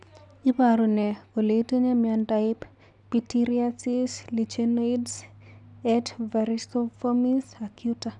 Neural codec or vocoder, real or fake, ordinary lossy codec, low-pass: none; real; none; 10.8 kHz